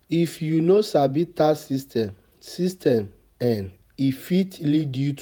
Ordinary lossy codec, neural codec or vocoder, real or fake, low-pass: none; vocoder, 48 kHz, 128 mel bands, Vocos; fake; none